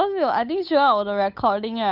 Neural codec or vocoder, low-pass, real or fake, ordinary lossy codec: codec, 16 kHz, 8 kbps, FunCodec, trained on Chinese and English, 25 frames a second; 5.4 kHz; fake; none